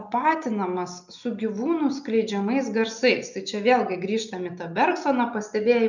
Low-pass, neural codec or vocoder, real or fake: 7.2 kHz; none; real